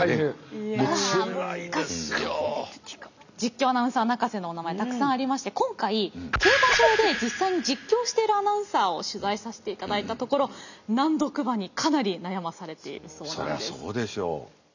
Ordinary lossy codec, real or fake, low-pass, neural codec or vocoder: none; real; 7.2 kHz; none